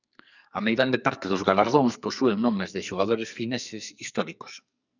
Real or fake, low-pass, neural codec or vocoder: fake; 7.2 kHz; codec, 44.1 kHz, 2.6 kbps, SNAC